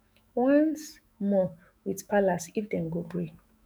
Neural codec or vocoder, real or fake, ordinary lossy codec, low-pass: autoencoder, 48 kHz, 128 numbers a frame, DAC-VAE, trained on Japanese speech; fake; none; none